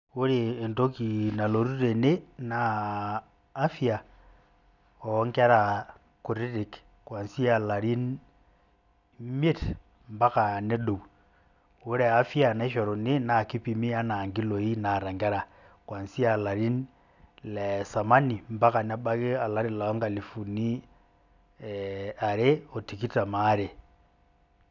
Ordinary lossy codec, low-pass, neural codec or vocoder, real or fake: none; 7.2 kHz; none; real